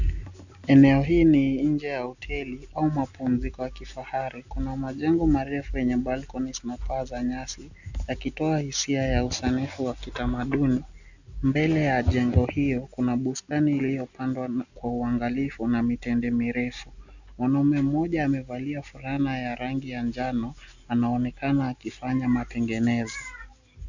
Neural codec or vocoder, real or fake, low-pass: none; real; 7.2 kHz